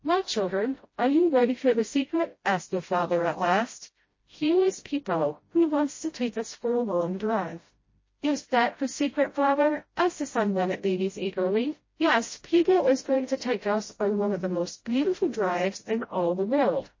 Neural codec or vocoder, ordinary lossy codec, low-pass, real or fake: codec, 16 kHz, 0.5 kbps, FreqCodec, smaller model; MP3, 32 kbps; 7.2 kHz; fake